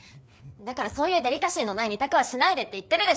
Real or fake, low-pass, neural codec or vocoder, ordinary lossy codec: fake; none; codec, 16 kHz, 4 kbps, FreqCodec, larger model; none